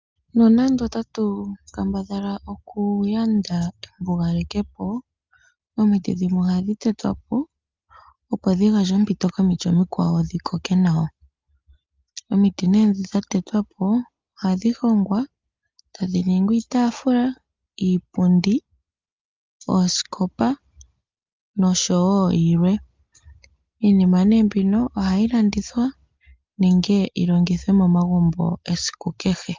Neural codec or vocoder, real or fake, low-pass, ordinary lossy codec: none; real; 7.2 kHz; Opus, 32 kbps